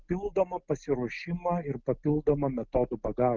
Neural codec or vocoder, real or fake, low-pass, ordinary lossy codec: none; real; 7.2 kHz; Opus, 24 kbps